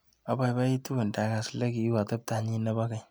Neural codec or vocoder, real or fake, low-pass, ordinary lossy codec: none; real; none; none